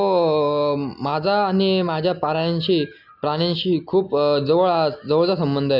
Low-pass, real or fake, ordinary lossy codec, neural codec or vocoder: 5.4 kHz; real; none; none